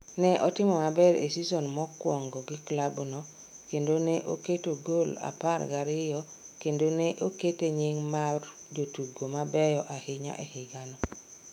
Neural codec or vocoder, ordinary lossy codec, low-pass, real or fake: autoencoder, 48 kHz, 128 numbers a frame, DAC-VAE, trained on Japanese speech; none; 19.8 kHz; fake